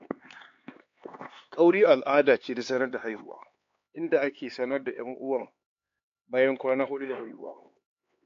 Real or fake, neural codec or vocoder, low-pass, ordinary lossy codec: fake; codec, 16 kHz, 4 kbps, X-Codec, HuBERT features, trained on LibriSpeech; 7.2 kHz; AAC, 48 kbps